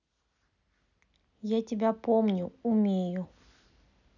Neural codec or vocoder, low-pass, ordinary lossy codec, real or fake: none; 7.2 kHz; none; real